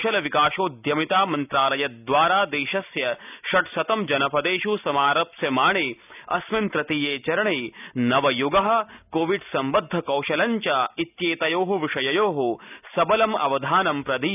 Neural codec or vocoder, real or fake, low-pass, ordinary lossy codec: none; real; 3.6 kHz; none